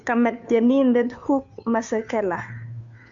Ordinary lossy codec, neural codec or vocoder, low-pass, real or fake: AAC, 64 kbps; codec, 16 kHz, 2 kbps, FunCodec, trained on Chinese and English, 25 frames a second; 7.2 kHz; fake